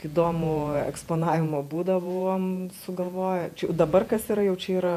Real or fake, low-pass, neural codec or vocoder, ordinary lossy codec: fake; 14.4 kHz; vocoder, 48 kHz, 128 mel bands, Vocos; MP3, 96 kbps